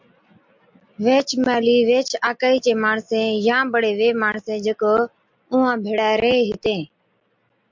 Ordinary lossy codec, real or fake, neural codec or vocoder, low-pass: MP3, 64 kbps; real; none; 7.2 kHz